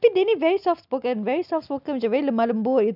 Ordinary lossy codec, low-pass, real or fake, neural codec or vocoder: none; 5.4 kHz; real; none